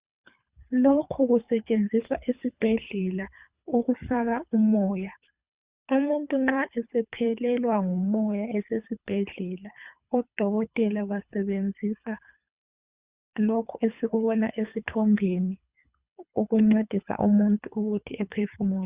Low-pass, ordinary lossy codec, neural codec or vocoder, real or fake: 3.6 kHz; Opus, 64 kbps; codec, 24 kHz, 3 kbps, HILCodec; fake